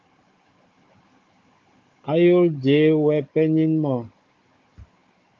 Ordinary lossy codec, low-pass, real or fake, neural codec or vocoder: Opus, 24 kbps; 7.2 kHz; fake; codec, 16 kHz, 16 kbps, FunCodec, trained on Chinese and English, 50 frames a second